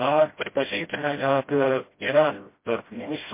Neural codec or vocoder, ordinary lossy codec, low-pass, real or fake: codec, 16 kHz, 0.5 kbps, FreqCodec, smaller model; MP3, 24 kbps; 3.6 kHz; fake